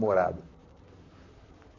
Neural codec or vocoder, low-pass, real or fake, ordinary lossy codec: vocoder, 44.1 kHz, 128 mel bands, Pupu-Vocoder; 7.2 kHz; fake; AAC, 32 kbps